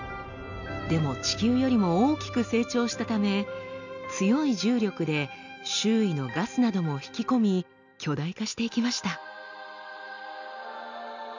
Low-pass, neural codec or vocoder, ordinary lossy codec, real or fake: 7.2 kHz; none; none; real